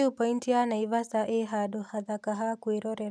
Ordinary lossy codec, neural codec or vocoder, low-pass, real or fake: none; none; none; real